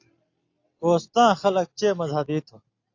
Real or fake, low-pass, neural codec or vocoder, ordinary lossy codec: real; 7.2 kHz; none; AAC, 48 kbps